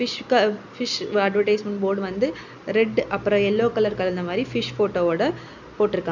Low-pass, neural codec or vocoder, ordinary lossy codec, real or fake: 7.2 kHz; none; none; real